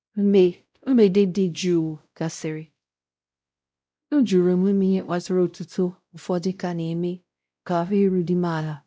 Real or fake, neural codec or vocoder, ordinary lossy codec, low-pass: fake; codec, 16 kHz, 0.5 kbps, X-Codec, WavLM features, trained on Multilingual LibriSpeech; none; none